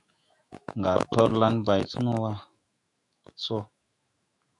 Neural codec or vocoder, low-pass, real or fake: autoencoder, 48 kHz, 128 numbers a frame, DAC-VAE, trained on Japanese speech; 10.8 kHz; fake